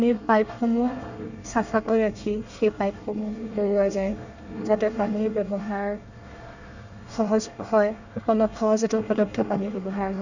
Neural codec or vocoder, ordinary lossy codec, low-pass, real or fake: codec, 24 kHz, 1 kbps, SNAC; none; 7.2 kHz; fake